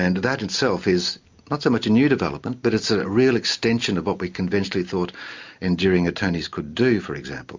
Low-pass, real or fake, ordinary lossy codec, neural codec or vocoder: 7.2 kHz; real; MP3, 64 kbps; none